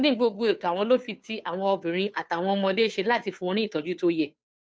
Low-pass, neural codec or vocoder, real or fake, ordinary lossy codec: none; codec, 16 kHz, 2 kbps, FunCodec, trained on Chinese and English, 25 frames a second; fake; none